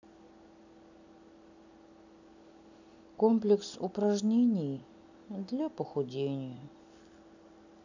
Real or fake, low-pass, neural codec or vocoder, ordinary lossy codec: real; 7.2 kHz; none; none